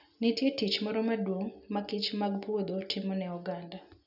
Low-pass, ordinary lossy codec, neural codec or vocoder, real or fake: 5.4 kHz; none; none; real